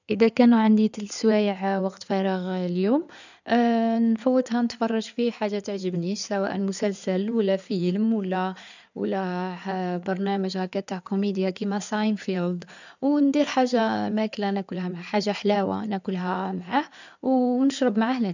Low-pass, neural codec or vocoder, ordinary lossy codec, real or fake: 7.2 kHz; codec, 16 kHz in and 24 kHz out, 2.2 kbps, FireRedTTS-2 codec; none; fake